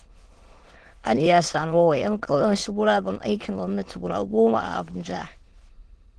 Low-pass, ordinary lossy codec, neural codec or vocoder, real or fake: 9.9 kHz; Opus, 16 kbps; autoencoder, 22.05 kHz, a latent of 192 numbers a frame, VITS, trained on many speakers; fake